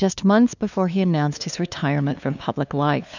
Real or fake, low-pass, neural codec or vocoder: fake; 7.2 kHz; codec, 16 kHz, 2 kbps, FunCodec, trained on LibriTTS, 25 frames a second